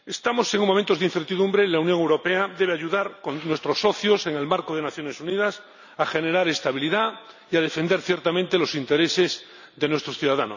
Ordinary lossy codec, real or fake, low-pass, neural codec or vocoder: none; real; 7.2 kHz; none